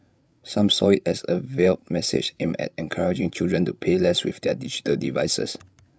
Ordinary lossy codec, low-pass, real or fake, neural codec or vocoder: none; none; fake; codec, 16 kHz, 16 kbps, FreqCodec, larger model